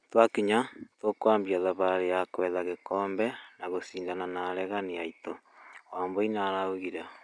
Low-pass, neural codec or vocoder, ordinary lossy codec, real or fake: 9.9 kHz; none; none; real